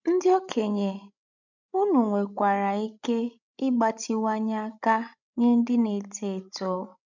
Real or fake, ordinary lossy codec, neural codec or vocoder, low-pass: fake; none; codec, 16 kHz, 16 kbps, FreqCodec, larger model; 7.2 kHz